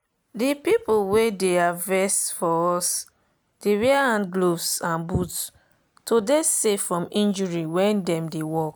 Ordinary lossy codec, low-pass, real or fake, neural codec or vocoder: none; none; real; none